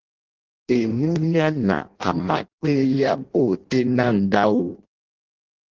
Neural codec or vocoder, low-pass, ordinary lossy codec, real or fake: codec, 16 kHz in and 24 kHz out, 0.6 kbps, FireRedTTS-2 codec; 7.2 kHz; Opus, 16 kbps; fake